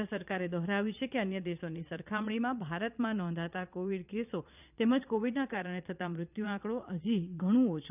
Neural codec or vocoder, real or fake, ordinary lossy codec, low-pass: vocoder, 22.05 kHz, 80 mel bands, Vocos; fake; none; 3.6 kHz